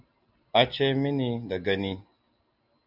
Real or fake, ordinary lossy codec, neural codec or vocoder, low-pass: real; MP3, 48 kbps; none; 5.4 kHz